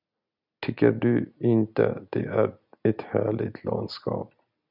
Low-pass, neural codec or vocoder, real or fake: 5.4 kHz; none; real